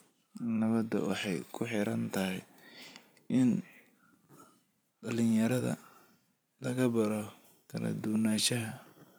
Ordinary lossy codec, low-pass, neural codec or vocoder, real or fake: none; none; vocoder, 44.1 kHz, 128 mel bands every 512 samples, BigVGAN v2; fake